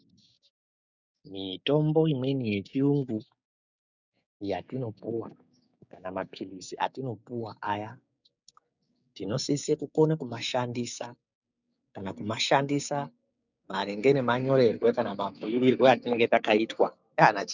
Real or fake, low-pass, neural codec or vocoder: fake; 7.2 kHz; codec, 16 kHz, 6 kbps, DAC